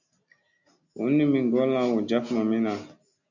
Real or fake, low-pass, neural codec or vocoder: real; 7.2 kHz; none